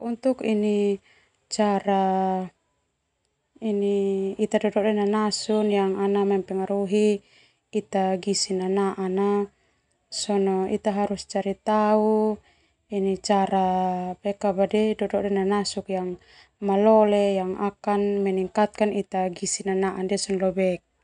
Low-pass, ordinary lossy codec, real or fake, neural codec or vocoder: 9.9 kHz; none; real; none